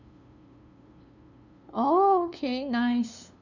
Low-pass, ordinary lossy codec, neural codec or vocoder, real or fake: 7.2 kHz; none; codec, 16 kHz, 2 kbps, FunCodec, trained on LibriTTS, 25 frames a second; fake